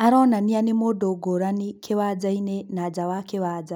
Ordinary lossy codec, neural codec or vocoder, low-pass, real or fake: none; none; 19.8 kHz; real